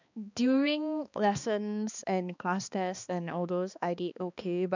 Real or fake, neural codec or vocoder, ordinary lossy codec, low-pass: fake; codec, 16 kHz, 2 kbps, X-Codec, HuBERT features, trained on balanced general audio; none; 7.2 kHz